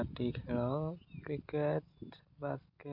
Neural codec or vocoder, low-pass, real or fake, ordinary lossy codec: none; 5.4 kHz; real; MP3, 48 kbps